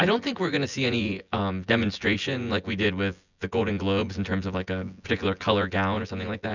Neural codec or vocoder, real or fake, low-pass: vocoder, 24 kHz, 100 mel bands, Vocos; fake; 7.2 kHz